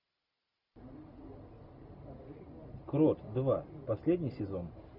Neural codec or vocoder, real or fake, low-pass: none; real; 5.4 kHz